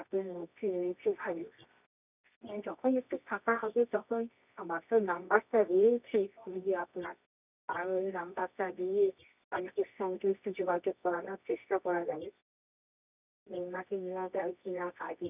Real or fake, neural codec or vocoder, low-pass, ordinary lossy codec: fake; codec, 24 kHz, 0.9 kbps, WavTokenizer, medium music audio release; 3.6 kHz; none